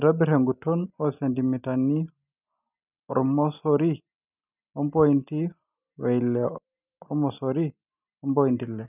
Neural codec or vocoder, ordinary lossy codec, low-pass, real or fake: none; none; 3.6 kHz; real